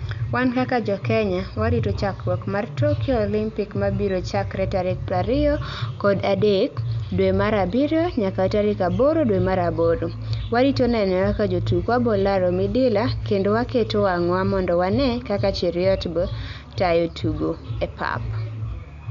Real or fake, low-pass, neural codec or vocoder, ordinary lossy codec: real; 7.2 kHz; none; MP3, 96 kbps